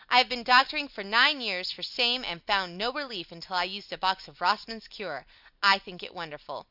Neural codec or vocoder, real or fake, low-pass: none; real; 5.4 kHz